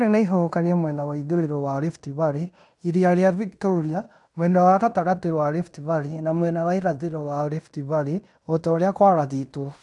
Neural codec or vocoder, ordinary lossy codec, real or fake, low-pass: codec, 16 kHz in and 24 kHz out, 0.9 kbps, LongCat-Audio-Codec, fine tuned four codebook decoder; none; fake; 10.8 kHz